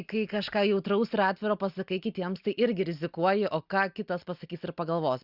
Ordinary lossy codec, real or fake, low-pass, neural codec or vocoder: Opus, 64 kbps; real; 5.4 kHz; none